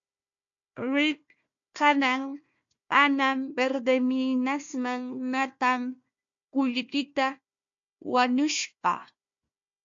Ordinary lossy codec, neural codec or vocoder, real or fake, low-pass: MP3, 48 kbps; codec, 16 kHz, 1 kbps, FunCodec, trained on Chinese and English, 50 frames a second; fake; 7.2 kHz